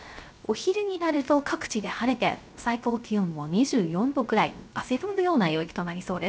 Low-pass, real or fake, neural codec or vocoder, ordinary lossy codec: none; fake; codec, 16 kHz, 0.3 kbps, FocalCodec; none